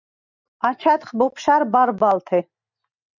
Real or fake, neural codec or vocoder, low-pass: real; none; 7.2 kHz